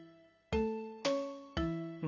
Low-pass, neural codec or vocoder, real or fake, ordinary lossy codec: 7.2 kHz; none; real; none